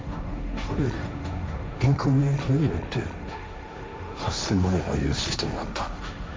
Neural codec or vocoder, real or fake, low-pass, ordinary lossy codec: codec, 16 kHz, 1.1 kbps, Voila-Tokenizer; fake; none; none